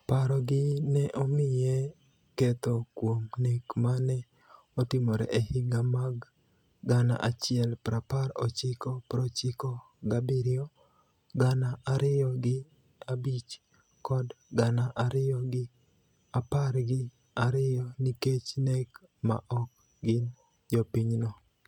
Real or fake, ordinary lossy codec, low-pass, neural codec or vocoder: real; none; 19.8 kHz; none